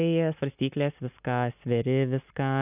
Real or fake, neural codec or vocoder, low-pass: real; none; 3.6 kHz